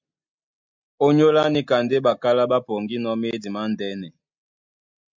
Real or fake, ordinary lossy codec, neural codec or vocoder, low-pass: real; MP3, 64 kbps; none; 7.2 kHz